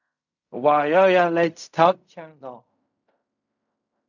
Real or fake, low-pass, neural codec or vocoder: fake; 7.2 kHz; codec, 16 kHz in and 24 kHz out, 0.4 kbps, LongCat-Audio-Codec, fine tuned four codebook decoder